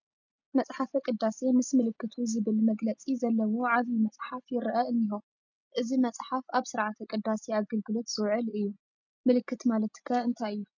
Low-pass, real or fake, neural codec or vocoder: 7.2 kHz; real; none